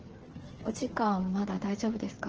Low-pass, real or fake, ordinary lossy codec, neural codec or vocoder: 7.2 kHz; fake; Opus, 16 kbps; codec, 16 kHz, 8 kbps, FreqCodec, smaller model